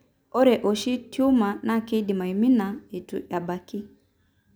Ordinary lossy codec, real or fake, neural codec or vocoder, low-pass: none; real; none; none